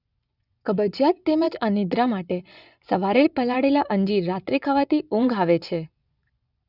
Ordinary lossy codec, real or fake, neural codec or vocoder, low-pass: none; fake; vocoder, 24 kHz, 100 mel bands, Vocos; 5.4 kHz